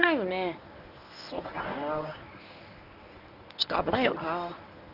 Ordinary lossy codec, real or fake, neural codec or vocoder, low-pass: none; fake; codec, 24 kHz, 0.9 kbps, WavTokenizer, medium speech release version 1; 5.4 kHz